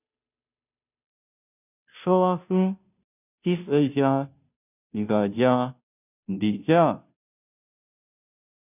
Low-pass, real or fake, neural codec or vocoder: 3.6 kHz; fake; codec, 16 kHz, 0.5 kbps, FunCodec, trained on Chinese and English, 25 frames a second